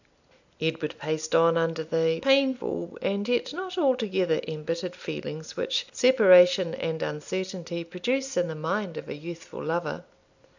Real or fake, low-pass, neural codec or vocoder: real; 7.2 kHz; none